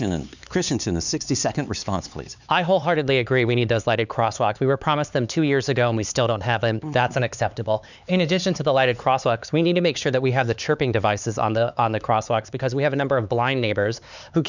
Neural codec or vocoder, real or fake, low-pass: codec, 16 kHz, 4 kbps, X-Codec, HuBERT features, trained on LibriSpeech; fake; 7.2 kHz